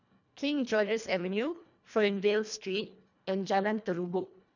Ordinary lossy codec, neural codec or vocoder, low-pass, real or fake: none; codec, 24 kHz, 1.5 kbps, HILCodec; 7.2 kHz; fake